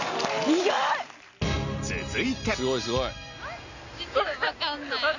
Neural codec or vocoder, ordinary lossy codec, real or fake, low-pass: none; none; real; 7.2 kHz